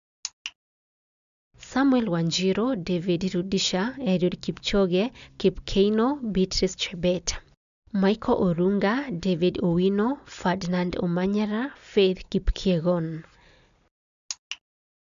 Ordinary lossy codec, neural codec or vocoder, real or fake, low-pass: AAC, 96 kbps; none; real; 7.2 kHz